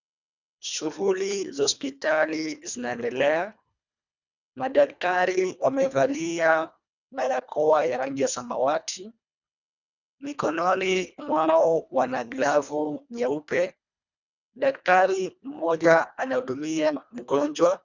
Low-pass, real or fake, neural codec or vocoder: 7.2 kHz; fake; codec, 24 kHz, 1.5 kbps, HILCodec